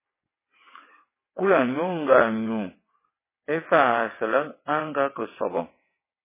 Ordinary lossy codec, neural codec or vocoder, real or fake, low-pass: MP3, 16 kbps; vocoder, 22.05 kHz, 80 mel bands, WaveNeXt; fake; 3.6 kHz